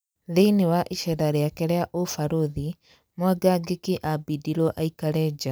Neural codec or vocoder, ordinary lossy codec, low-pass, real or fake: none; none; none; real